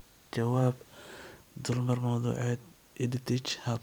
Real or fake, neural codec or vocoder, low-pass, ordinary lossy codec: fake; vocoder, 44.1 kHz, 128 mel bands every 256 samples, BigVGAN v2; none; none